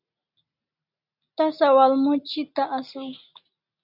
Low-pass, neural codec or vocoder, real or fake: 5.4 kHz; none; real